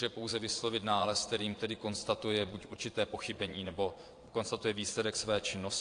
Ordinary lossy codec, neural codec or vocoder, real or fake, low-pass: AAC, 48 kbps; vocoder, 22.05 kHz, 80 mel bands, Vocos; fake; 9.9 kHz